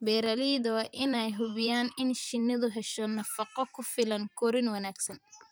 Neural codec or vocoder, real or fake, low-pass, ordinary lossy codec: vocoder, 44.1 kHz, 128 mel bands, Pupu-Vocoder; fake; none; none